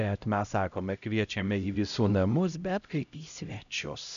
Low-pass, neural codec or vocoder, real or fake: 7.2 kHz; codec, 16 kHz, 0.5 kbps, X-Codec, HuBERT features, trained on LibriSpeech; fake